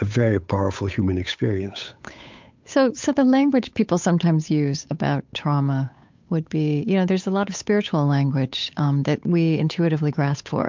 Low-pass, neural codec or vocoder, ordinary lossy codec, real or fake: 7.2 kHz; codec, 16 kHz, 8 kbps, FunCodec, trained on Chinese and English, 25 frames a second; MP3, 64 kbps; fake